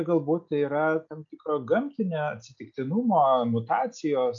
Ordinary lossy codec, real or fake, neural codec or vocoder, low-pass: MP3, 48 kbps; real; none; 7.2 kHz